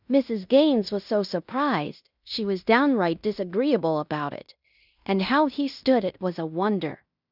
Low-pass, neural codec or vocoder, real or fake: 5.4 kHz; codec, 16 kHz in and 24 kHz out, 0.9 kbps, LongCat-Audio-Codec, fine tuned four codebook decoder; fake